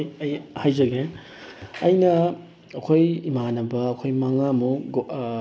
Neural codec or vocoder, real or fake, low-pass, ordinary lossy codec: none; real; none; none